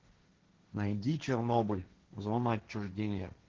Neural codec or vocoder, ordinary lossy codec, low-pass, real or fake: codec, 16 kHz, 1.1 kbps, Voila-Tokenizer; Opus, 16 kbps; 7.2 kHz; fake